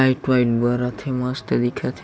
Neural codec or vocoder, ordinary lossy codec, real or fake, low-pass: none; none; real; none